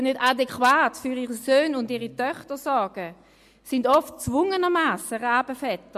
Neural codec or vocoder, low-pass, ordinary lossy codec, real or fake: none; 14.4 kHz; MP3, 64 kbps; real